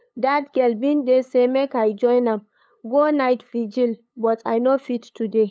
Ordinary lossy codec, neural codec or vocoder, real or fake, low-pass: none; codec, 16 kHz, 8 kbps, FunCodec, trained on LibriTTS, 25 frames a second; fake; none